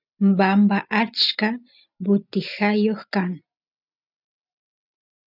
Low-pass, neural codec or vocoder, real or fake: 5.4 kHz; none; real